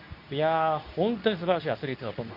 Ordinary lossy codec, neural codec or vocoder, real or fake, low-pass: none; codec, 24 kHz, 0.9 kbps, WavTokenizer, medium speech release version 2; fake; 5.4 kHz